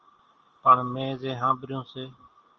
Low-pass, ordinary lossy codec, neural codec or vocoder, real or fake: 7.2 kHz; Opus, 24 kbps; none; real